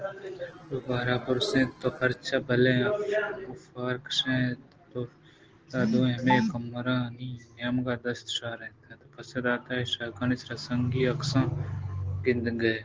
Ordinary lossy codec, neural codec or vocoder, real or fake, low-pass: Opus, 16 kbps; none; real; 7.2 kHz